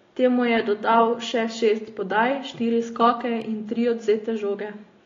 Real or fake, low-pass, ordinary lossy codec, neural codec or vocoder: real; 7.2 kHz; AAC, 32 kbps; none